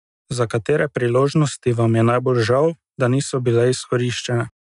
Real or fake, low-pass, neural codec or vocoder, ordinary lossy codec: real; 10.8 kHz; none; none